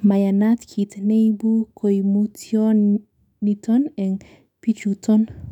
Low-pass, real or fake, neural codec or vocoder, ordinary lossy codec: 19.8 kHz; fake; autoencoder, 48 kHz, 128 numbers a frame, DAC-VAE, trained on Japanese speech; none